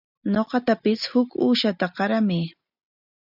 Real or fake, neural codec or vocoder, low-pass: real; none; 5.4 kHz